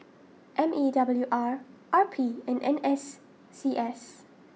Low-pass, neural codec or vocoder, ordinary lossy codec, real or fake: none; none; none; real